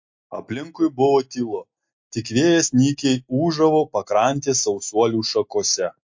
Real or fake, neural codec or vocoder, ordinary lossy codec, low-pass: real; none; MP3, 48 kbps; 7.2 kHz